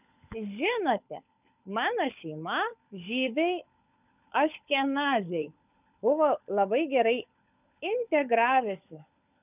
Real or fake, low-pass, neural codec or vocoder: fake; 3.6 kHz; codec, 16 kHz, 16 kbps, FunCodec, trained on LibriTTS, 50 frames a second